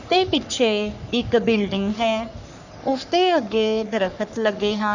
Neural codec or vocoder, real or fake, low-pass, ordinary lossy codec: codec, 44.1 kHz, 3.4 kbps, Pupu-Codec; fake; 7.2 kHz; none